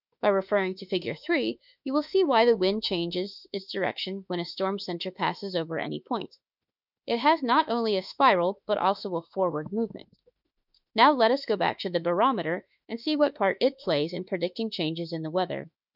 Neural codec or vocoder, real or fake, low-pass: autoencoder, 48 kHz, 32 numbers a frame, DAC-VAE, trained on Japanese speech; fake; 5.4 kHz